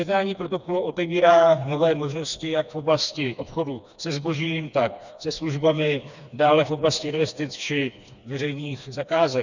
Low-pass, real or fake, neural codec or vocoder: 7.2 kHz; fake; codec, 16 kHz, 2 kbps, FreqCodec, smaller model